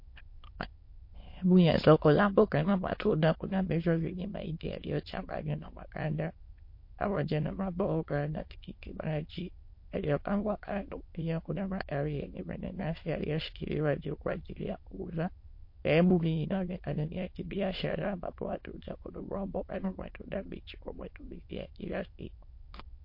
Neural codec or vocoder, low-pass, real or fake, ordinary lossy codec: autoencoder, 22.05 kHz, a latent of 192 numbers a frame, VITS, trained on many speakers; 5.4 kHz; fake; MP3, 32 kbps